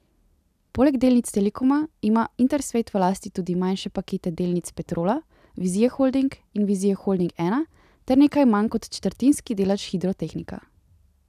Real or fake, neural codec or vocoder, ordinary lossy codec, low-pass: real; none; none; 14.4 kHz